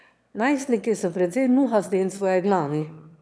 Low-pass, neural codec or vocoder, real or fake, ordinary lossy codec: none; autoencoder, 22.05 kHz, a latent of 192 numbers a frame, VITS, trained on one speaker; fake; none